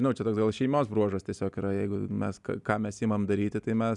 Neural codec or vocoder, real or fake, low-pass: none; real; 10.8 kHz